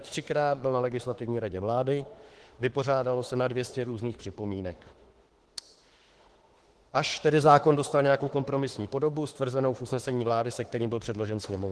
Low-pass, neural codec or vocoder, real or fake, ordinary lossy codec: 10.8 kHz; autoencoder, 48 kHz, 32 numbers a frame, DAC-VAE, trained on Japanese speech; fake; Opus, 16 kbps